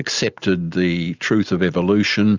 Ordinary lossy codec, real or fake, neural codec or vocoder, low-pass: Opus, 64 kbps; real; none; 7.2 kHz